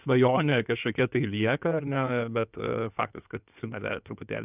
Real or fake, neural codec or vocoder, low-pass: fake; codec, 16 kHz in and 24 kHz out, 2.2 kbps, FireRedTTS-2 codec; 3.6 kHz